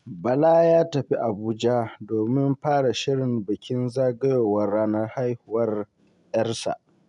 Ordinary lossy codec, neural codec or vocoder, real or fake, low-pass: none; none; real; 10.8 kHz